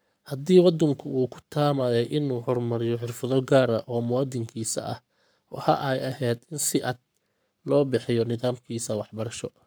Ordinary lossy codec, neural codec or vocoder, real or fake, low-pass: none; codec, 44.1 kHz, 7.8 kbps, Pupu-Codec; fake; none